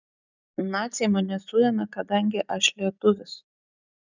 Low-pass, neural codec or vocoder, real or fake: 7.2 kHz; none; real